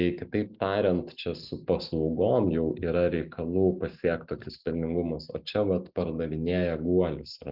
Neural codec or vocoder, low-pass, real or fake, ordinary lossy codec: none; 5.4 kHz; real; Opus, 32 kbps